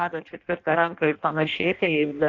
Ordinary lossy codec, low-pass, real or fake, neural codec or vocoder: AAC, 48 kbps; 7.2 kHz; fake; codec, 16 kHz in and 24 kHz out, 0.6 kbps, FireRedTTS-2 codec